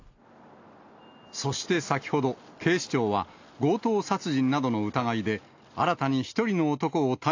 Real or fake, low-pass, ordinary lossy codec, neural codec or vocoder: real; 7.2 kHz; AAC, 48 kbps; none